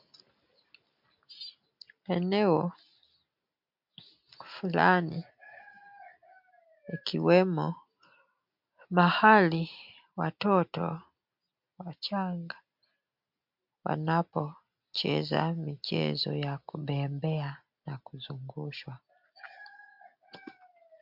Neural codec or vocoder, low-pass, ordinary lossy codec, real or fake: none; 5.4 kHz; MP3, 48 kbps; real